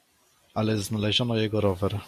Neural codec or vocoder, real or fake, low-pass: vocoder, 44.1 kHz, 128 mel bands every 256 samples, BigVGAN v2; fake; 14.4 kHz